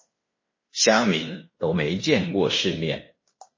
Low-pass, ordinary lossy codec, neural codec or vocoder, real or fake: 7.2 kHz; MP3, 32 kbps; codec, 16 kHz in and 24 kHz out, 0.9 kbps, LongCat-Audio-Codec, fine tuned four codebook decoder; fake